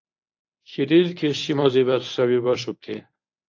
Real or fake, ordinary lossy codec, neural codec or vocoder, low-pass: fake; AAC, 48 kbps; codec, 24 kHz, 0.9 kbps, WavTokenizer, medium speech release version 1; 7.2 kHz